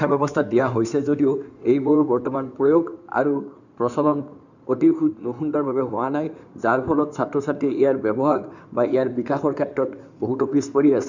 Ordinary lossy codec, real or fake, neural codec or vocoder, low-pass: none; fake; codec, 16 kHz in and 24 kHz out, 2.2 kbps, FireRedTTS-2 codec; 7.2 kHz